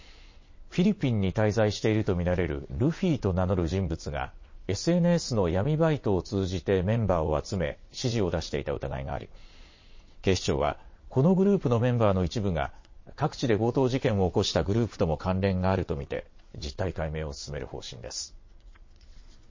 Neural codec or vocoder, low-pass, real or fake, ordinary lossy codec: vocoder, 22.05 kHz, 80 mel bands, WaveNeXt; 7.2 kHz; fake; MP3, 32 kbps